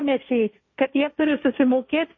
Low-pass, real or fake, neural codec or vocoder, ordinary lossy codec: 7.2 kHz; fake; codec, 16 kHz, 1.1 kbps, Voila-Tokenizer; MP3, 32 kbps